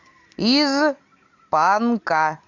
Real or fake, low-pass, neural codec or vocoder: real; 7.2 kHz; none